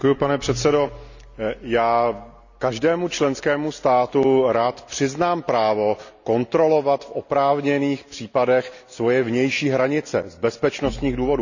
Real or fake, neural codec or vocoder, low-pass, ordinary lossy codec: real; none; 7.2 kHz; none